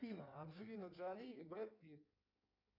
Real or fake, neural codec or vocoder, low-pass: fake; codec, 16 kHz in and 24 kHz out, 1.1 kbps, FireRedTTS-2 codec; 5.4 kHz